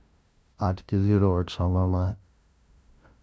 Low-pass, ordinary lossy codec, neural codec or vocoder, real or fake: none; none; codec, 16 kHz, 0.5 kbps, FunCodec, trained on LibriTTS, 25 frames a second; fake